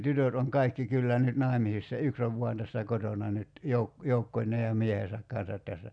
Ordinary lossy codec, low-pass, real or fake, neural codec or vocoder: none; 9.9 kHz; real; none